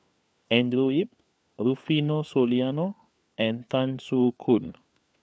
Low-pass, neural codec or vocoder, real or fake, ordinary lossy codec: none; codec, 16 kHz, 4 kbps, FunCodec, trained on LibriTTS, 50 frames a second; fake; none